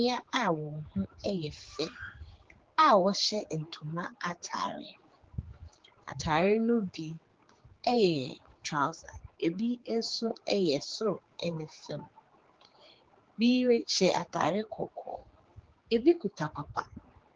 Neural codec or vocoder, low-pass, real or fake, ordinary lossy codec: codec, 16 kHz, 4 kbps, X-Codec, HuBERT features, trained on general audio; 7.2 kHz; fake; Opus, 16 kbps